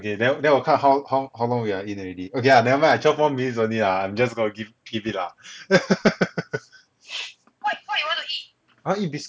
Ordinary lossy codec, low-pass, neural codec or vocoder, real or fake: none; none; none; real